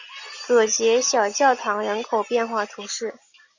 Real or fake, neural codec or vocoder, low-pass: real; none; 7.2 kHz